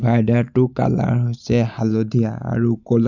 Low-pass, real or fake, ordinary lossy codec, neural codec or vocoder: 7.2 kHz; real; none; none